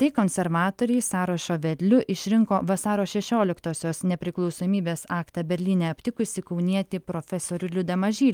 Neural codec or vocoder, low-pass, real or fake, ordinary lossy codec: none; 19.8 kHz; real; Opus, 32 kbps